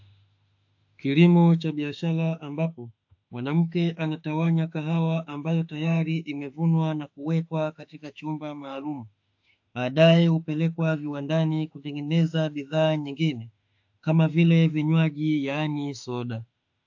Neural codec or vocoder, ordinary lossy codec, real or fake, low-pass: autoencoder, 48 kHz, 32 numbers a frame, DAC-VAE, trained on Japanese speech; AAC, 48 kbps; fake; 7.2 kHz